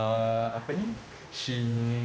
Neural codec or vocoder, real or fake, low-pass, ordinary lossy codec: codec, 16 kHz, 1 kbps, X-Codec, HuBERT features, trained on general audio; fake; none; none